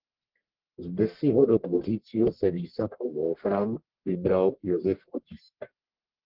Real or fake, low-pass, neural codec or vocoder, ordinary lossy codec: fake; 5.4 kHz; codec, 44.1 kHz, 1.7 kbps, Pupu-Codec; Opus, 16 kbps